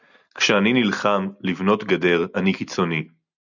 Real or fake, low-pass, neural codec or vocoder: real; 7.2 kHz; none